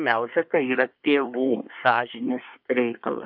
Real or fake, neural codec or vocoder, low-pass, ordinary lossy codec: fake; codec, 24 kHz, 1 kbps, SNAC; 5.4 kHz; MP3, 48 kbps